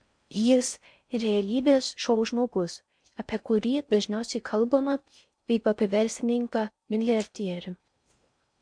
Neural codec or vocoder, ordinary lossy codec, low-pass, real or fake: codec, 16 kHz in and 24 kHz out, 0.6 kbps, FocalCodec, streaming, 4096 codes; MP3, 64 kbps; 9.9 kHz; fake